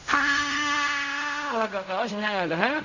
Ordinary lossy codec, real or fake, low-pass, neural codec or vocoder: Opus, 64 kbps; fake; 7.2 kHz; codec, 16 kHz in and 24 kHz out, 0.4 kbps, LongCat-Audio-Codec, fine tuned four codebook decoder